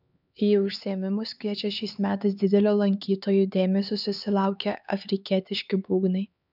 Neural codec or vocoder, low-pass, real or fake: codec, 16 kHz, 4 kbps, X-Codec, HuBERT features, trained on LibriSpeech; 5.4 kHz; fake